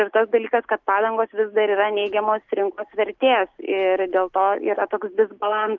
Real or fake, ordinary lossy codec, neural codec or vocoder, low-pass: real; Opus, 32 kbps; none; 7.2 kHz